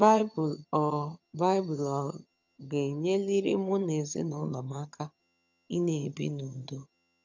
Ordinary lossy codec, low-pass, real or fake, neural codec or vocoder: none; 7.2 kHz; fake; vocoder, 22.05 kHz, 80 mel bands, HiFi-GAN